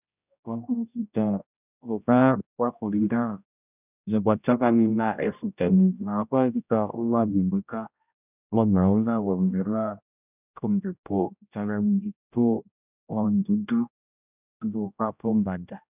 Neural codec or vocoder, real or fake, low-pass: codec, 16 kHz, 0.5 kbps, X-Codec, HuBERT features, trained on general audio; fake; 3.6 kHz